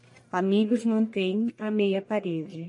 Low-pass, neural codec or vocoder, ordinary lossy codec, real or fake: 10.8 kHz; codec, 44.1 kHz, 1.7 kbps, Pupu-Codec; MP3, 48 kbps; fake